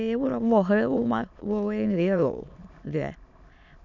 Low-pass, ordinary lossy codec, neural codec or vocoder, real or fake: 7.2 kHz; none; autoencoder, 22.05 kHz, a latent of 192 numbers a frame, VITS, trained on many speakers; fake